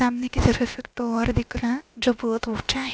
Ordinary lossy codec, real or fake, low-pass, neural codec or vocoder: none; fake; none; codec, 16 kHz, about 1 kbps, DyCAST, with the encoder's durations